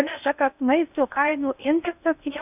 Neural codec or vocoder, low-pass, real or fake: codec, 16 kHz in and 24 kHz out, 0.6 kbps, FocalCodec, streaming, 4096 codes; 3.6 kHz; fake